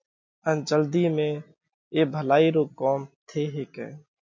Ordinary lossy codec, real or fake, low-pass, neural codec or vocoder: MP3, 48 kbps; real; 7.2 kHz; none